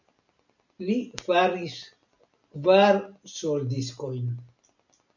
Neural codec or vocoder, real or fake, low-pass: none; real; 7.2 kHz